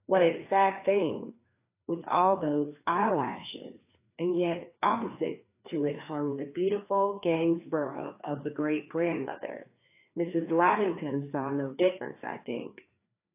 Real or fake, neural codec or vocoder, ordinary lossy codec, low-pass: fake; codec, 16 kHz, 2 kbps, FreqCodec, larger model; AAC, 24 kbps; 3.6 kHz